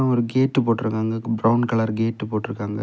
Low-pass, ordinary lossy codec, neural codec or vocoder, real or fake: none; none; none; real